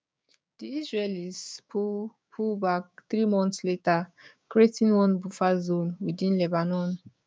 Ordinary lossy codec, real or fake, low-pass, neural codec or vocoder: none; fake; none; codec, 16 kHz, 6 kbps, DAC